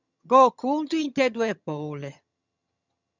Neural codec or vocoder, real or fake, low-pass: vocoder, 22.05 kHz, 80 mel bands, HiFi-GAN; fake; 7.2 kHz